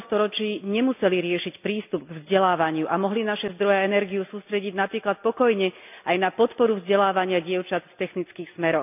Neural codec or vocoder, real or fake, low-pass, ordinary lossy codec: none; real; 3.6 kHz; none